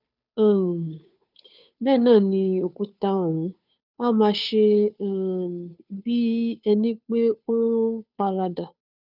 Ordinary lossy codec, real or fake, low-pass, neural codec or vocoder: none; fake; 5.4 kHz; codec, 16 kHz, 2 kbps, FunCodec, trained on Chinese and English, 25 frames a second